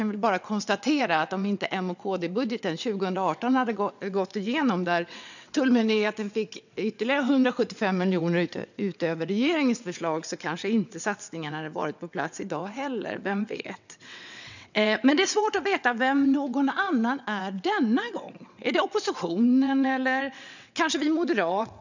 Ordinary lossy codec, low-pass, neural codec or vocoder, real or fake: none; 7.2 kHz; vocoder, 22.05 kHz, 80 mel bands, WaveNeXt; fake